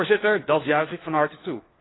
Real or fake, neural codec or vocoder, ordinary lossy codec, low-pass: fake; codec, 16 kHz, 4 kbps, FunCodec, trained on LibriTTS, 50 frames a second; AAC, 16 kbps; 7.2 kHz